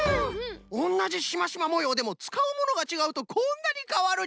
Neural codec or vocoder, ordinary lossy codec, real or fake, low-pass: none; none; real; none